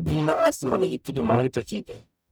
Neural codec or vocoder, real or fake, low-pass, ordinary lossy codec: codec, 44.1 kHz, 0.9 kbps, DAC; fake; none; none